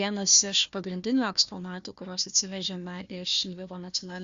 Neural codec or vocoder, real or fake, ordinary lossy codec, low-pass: codec, 16 kHz, 1 kbps, FunCodec, trained on Chinese and English, 50 frames a second; fake; Opus, 64 kbps; 7.2 kHz